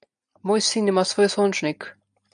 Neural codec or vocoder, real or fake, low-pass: none; real; 9.9 kHz